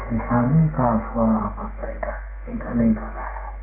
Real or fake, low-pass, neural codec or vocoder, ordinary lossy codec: fake; 3.6 kHz; codec, 32 kHz, 1.9 kbps, SNAC; AAC, 24 kbps